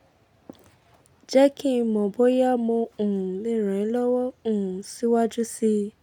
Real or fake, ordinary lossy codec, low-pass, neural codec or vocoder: real; none; 19.8 kHz; none